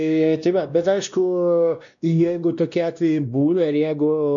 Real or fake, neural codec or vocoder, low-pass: fake; codec, 16 kHz, 1 kbps, X-Codec, WavLM features, trained on Multilingual LibriSpeech; 7.2 kHz